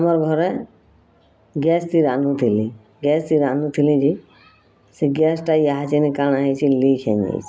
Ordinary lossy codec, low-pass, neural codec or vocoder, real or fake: none; none; none; real